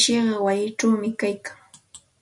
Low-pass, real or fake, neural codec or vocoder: 10.8 kHz; real; none